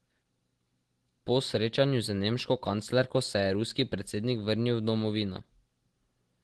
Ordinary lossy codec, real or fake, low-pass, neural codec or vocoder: Opus, 16 kbps; real; 10.8 kHz; none